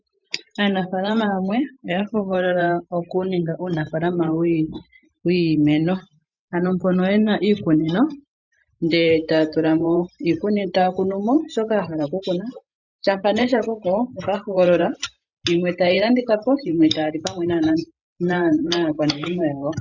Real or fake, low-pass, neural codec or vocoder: fake; 7.2 kHz; vocoder, 44.1 kHz, 128 mel bands every 512 samples, BigVGAN v2